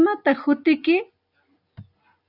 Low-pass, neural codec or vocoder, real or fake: 5.4 kHz; none; real